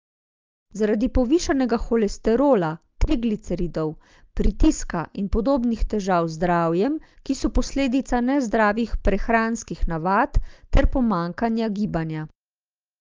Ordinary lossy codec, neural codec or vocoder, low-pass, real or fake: Opus, 24 kbps; none; 7.2 kHz; real